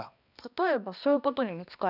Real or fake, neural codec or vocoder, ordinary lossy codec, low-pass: fake; codec, 16 kHz, 1 kbps, X-Codec, HuBERT features, trained on balanced general audio; none; 5.4 kHz